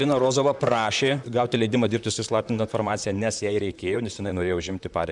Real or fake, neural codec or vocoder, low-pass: fake; vocoder, 44.1 kHz, 128 mel bands, Pupu-Vocoder; 10.8 kHz